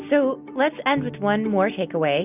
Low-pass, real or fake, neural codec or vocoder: 3.6 kHz; real; none